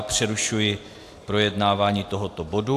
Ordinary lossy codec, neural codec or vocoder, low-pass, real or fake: AAC, 64 kbps; none; 14.4 kHz; real